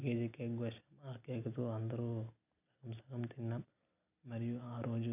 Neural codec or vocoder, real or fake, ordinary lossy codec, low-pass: none; real; none; 3.6 kHz